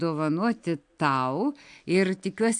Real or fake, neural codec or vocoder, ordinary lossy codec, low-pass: real; none; AAC, 64 kbps; 9.9 kHz